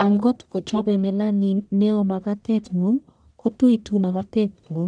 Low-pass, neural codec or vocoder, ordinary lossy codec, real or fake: 9.9 kHz; codec, 44.1 kHz, 1.7 kbps, Pupu-Codec; none; fake